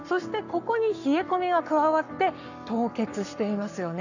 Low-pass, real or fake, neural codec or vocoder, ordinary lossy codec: 7.2 kHz; fake; codec, 44.1 kHz, 7.8 kbps, Pupu-Codec; none